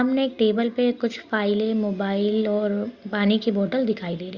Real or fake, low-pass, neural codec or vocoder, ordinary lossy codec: real; 7.2 kHz; none; Opus, 64 kbps